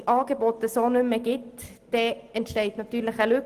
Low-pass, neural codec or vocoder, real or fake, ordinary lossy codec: 14.4 kHz; none; real; Opus, 24 kbps